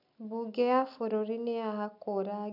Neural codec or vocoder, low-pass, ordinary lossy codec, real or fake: none; 5.4 kHz; none; real